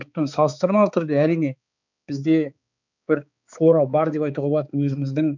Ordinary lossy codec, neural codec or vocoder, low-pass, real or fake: none; codec, 16 kHz, 4 kbps, X-Codec, HuBERT features, trained on balanced general audio; 7.2 kHz; fake